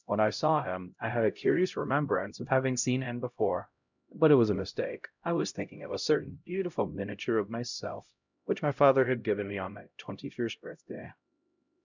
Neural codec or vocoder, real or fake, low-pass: codec, 16 kHz, 0.5 kbps, X-Codec, HuBERT features, trained on LibriSpeech; fake; 7.2 kHz